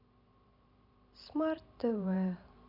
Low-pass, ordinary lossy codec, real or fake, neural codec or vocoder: 5.4 kHz; none; fake; vocoder, 44.1 kHz, 128 mel bands every 512 samples, BigVGAN v2